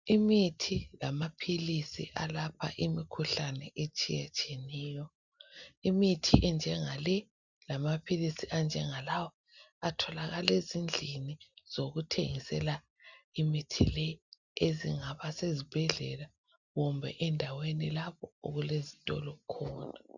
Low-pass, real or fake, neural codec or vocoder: 7.2 kHz; real; none